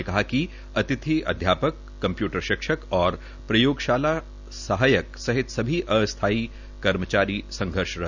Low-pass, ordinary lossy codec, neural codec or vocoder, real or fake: 7.2 kHz; none; none; real